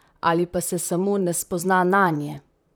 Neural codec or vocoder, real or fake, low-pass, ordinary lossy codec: vocoder, 44.1 kHz, 128 mel bands, Pupu-Vocoder; fake; none; none